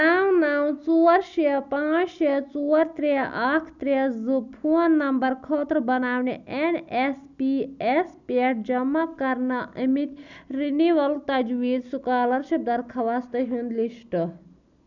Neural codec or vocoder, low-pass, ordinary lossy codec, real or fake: none; 7.2 kHz; none; real